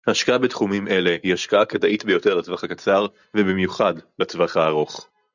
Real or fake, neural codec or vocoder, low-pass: real; none; 7.2 kHz